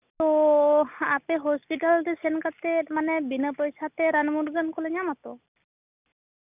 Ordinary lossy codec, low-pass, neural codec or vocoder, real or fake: none; 3.6 kHz; none; real